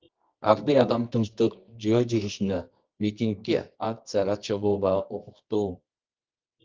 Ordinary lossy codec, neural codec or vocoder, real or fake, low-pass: Opus, 32 kbps; codec, 24 kHz, 0.9 kbps, WavTokenizer, medium music audio release; fake; 7.2 kHz